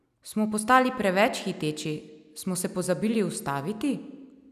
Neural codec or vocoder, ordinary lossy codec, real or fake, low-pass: none; none; real; 14.4 kHz